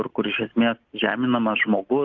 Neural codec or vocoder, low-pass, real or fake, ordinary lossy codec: none; 7.2 kHz; real; Opus, 24 kbps